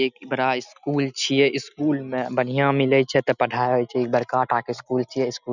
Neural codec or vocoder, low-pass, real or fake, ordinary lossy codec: none; 7.2 kHz; real; none